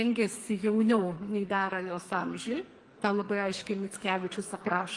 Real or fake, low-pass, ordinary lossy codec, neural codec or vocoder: fake; 10.8 kHz; Opus, 24 kbps; codec, 44.1 kHz, 2.6 kbps, SNAC